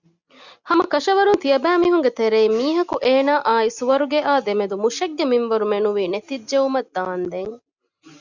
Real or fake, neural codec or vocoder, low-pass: real; none; 7.2 kHz